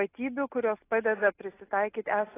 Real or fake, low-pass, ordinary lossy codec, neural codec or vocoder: real; 3.6 kHz; AAC, 16 kbps; none